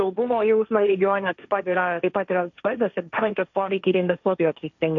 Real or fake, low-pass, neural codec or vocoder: fake; 7.2 kHz; codec, 16 kHz, 1.1 kbps, Voila-Tokenizer